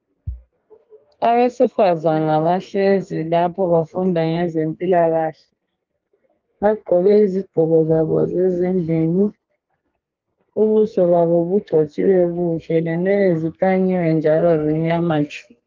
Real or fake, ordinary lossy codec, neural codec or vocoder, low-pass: fake; Opus, 32 kbps; codec, 32 kHz, 1.9 kbps, SNAC; 7.2 kHz